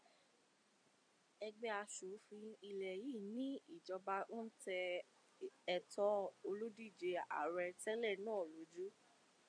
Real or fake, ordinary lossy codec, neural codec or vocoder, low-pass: real; MP3, 96 kbps; none; 9.9 kHz